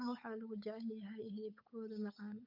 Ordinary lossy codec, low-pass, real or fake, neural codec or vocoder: none; 5.4 kHz; fake; codec, 16 kHz, 8 kbps, FunCodec, trained on Chinese and English, 25 frames a second